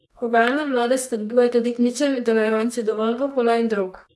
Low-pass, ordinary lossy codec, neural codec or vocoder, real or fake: none; none; codec, 24 kHz, 0.9 kbps, WavTokenizer, medium music audio release; fake